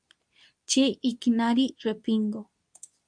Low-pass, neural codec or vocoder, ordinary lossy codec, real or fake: 9.9 kHz; codec, 24 kHz, 0.9 kbps, WavTokenizer, medium speech release version 2; MP3, 96 kbps; fake